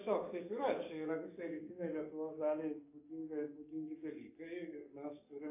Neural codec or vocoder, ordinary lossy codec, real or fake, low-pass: codec, 44.1 kHz, 7.8 kbps, DAC; AAC, 32 kbps; fake; 3.6 kHz